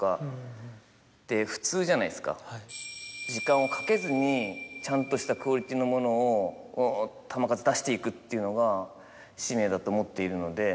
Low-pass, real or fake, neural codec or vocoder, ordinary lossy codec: none; real; none; none